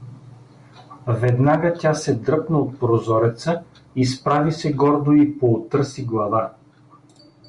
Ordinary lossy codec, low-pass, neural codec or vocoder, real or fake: Opus, 64 kbps; 10.8 kHz; none; real